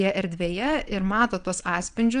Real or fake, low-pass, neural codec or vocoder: fake; 9.9 kHz; vocoder, 22.05 kHz, 80 mel bands, WaveNeXt